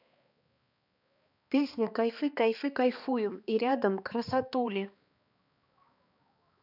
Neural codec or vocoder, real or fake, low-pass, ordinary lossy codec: codec, 16 kHz, 2 kbps, X-Codec, HuBERT features, trained on balanced general audio; fake; 5.4 kHz; none